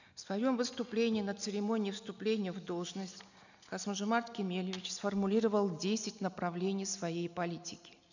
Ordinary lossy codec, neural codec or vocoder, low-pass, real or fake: none; none; 7.2 kHz; real